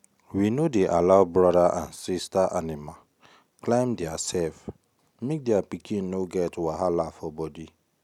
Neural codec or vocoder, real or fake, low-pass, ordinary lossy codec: none; real; 19.8 kHz; none